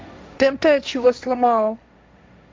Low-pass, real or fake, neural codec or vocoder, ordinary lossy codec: none; fake; codec, 16 kHz, 1.1 kbps, Voila-Tokenizer; none